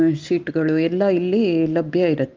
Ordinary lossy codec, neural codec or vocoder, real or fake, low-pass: Opus, 32 kbps; none; real; 7.2 kHz